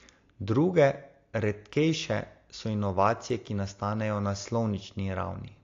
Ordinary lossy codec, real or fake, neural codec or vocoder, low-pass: AAC, 48 kbps; real; none; 7.2 kHz